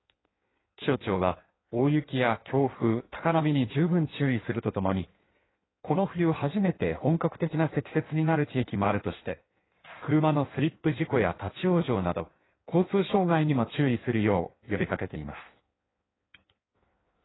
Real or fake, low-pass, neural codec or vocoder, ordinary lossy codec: fake; 7.2 kHz; codec, 16 kHz in and 24 kHz out, 1.1 kbps, FireRedTTS-2 codec; AAC, 16 kbps